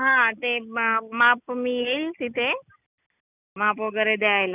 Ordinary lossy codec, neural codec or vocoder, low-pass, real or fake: none; none; 3.6 kHz; real